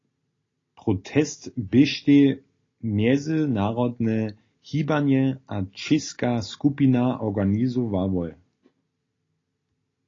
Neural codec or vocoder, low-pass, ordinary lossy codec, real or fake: none; 7.2 kHz; AAC, 32 kbps; real